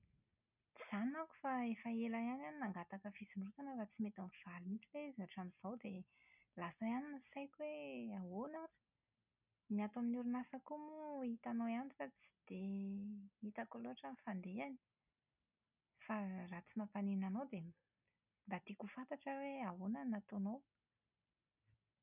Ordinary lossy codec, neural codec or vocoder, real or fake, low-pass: none; none; real; 3.6 kHz